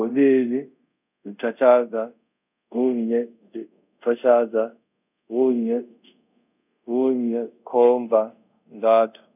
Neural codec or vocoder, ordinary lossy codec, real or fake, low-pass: codec, 24 kHz, 0.5 kbps, DualCodec; none; fake; 3.6 kHz